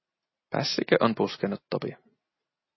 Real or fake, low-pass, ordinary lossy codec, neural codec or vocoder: real; 7.2 kHz; MP3, 24 kbps; none